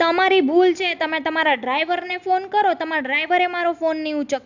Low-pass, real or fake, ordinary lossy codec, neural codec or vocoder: 7.2 kHz; real; none; none